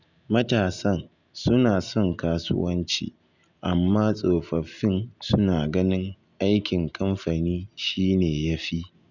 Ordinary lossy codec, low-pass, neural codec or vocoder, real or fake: none; 7.2 kHz; none; real